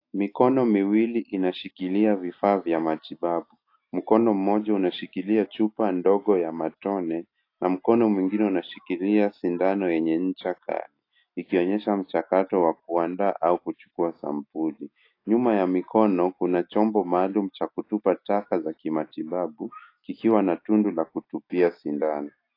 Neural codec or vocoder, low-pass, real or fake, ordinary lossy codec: none; 5.4 kHz; real; AAC, 32 kbps